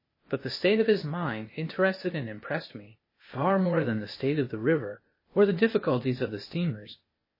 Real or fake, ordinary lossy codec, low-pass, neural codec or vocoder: fake; MP3, 24 kbps; 5.4 kHz; codec, 16 kHz, 0.8 kbps, ZipCodec